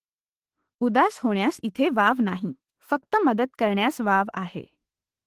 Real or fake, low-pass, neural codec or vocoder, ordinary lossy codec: fake; 14.4 kHz; autoencoder, 48 kHz, 32 numbers a frame, DAC-VAE, trained on Japanese speech; Opus, 16 kbps